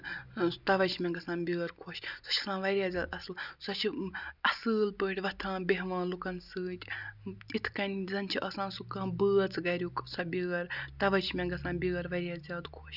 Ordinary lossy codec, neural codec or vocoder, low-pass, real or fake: none; none; 5.4 kHz; real